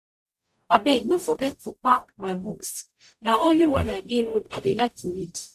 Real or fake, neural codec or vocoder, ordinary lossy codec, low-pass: fake; codec, 44.1 kHz, 0.9 kbps, DAC; none; 14.4 kHz